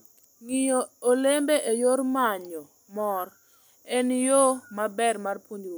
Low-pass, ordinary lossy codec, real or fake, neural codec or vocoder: none; none; real; none